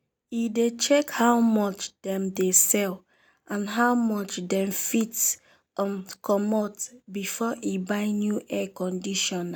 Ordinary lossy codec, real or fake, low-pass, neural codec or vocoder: none; real; none; none